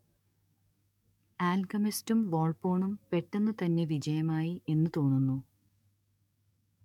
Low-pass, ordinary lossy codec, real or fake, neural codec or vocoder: 19.8 kHz; MP3, 96 kbps; fake; codec, 44.1 kHz, 7.8 kbps, DAC